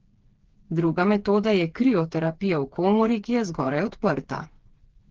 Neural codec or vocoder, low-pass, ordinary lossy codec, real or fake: codec, 16 kHz, 4 kbps, FreqCodec, smaller model; 7.2 kHz; Opus, 16 kbps; fake